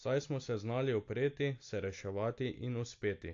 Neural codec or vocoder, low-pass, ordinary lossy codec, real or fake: none; 7.2 kHz; AAC, 48 kbps; real